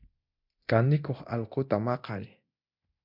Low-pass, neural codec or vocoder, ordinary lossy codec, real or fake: 5.4 kHz; codec, 24 kHz, 0.9 kbps, DualCodec; MP3, 48 kbps; fake